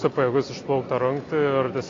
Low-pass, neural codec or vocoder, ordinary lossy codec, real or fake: 7.2 kHz; none; AAC, 32 kbps; real